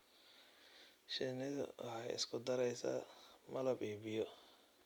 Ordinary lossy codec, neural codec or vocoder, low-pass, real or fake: MP3, 96 kbps; none; 19.8 kHz; real